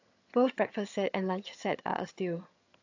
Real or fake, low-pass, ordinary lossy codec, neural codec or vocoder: fake; 7.2 kHz; AAC, 48 kbps; vocoder, 22.05 kHz, 80 mel bands, HiFi-GAN